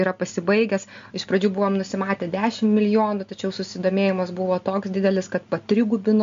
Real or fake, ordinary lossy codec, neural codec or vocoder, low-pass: real; MP3, 48 kbps; none; 7.2 kHz